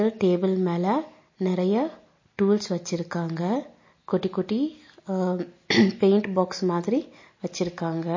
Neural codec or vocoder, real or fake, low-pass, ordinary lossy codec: none; real; 7.2 kHz; MP3, 32 kbps